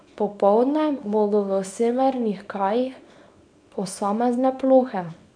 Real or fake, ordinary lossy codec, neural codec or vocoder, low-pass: fake; none; codec, 24 kHz, 0.9 kbps, WavTokenizer, small release; 9.9 kHz